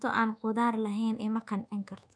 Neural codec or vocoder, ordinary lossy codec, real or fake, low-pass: codec, 24 kHz, 1.2 kbps, DualCodec; none; fake; 9.9 kHz